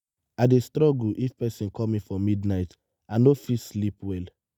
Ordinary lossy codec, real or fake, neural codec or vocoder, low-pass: none; real; none; 19.8 kHz